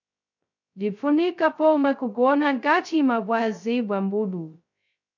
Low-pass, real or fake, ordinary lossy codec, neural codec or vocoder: 7.2 kHz; fake; MP3, 64 kbps; codec, 16 kHz, 0.2 kbps, FocalCodec